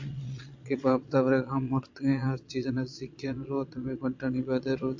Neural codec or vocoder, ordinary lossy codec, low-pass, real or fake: vocoder, 22.05 kHz, 80 mel bands, Vocos; AAC, 48 kbps; 7.2 kHz; fake